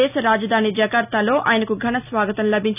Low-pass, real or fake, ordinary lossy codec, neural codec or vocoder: 3.6 kHz; real; none; none